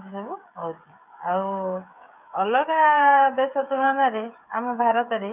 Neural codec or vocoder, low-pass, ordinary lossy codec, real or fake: codec, 16 kHz, 16 kbps, FreqCodec, smaller model; 3.6 kHz; none; fake